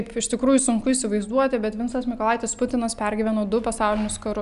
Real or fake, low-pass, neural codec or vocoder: real; 10.8 kHz; none